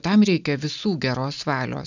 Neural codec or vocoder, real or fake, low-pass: none; real; 7.2 kHz